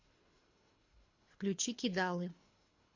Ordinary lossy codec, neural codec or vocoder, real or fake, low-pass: MP3, 48 kbps; codec, 24 kHz, 3 kbps, HILCodec; fake; 7.2 kHz